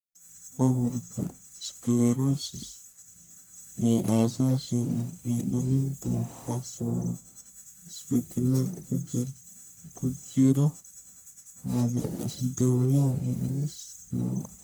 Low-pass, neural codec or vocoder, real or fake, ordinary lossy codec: none; codec, 44.1 kHz, 1.7 kbps, Pupu-Codec; fake; none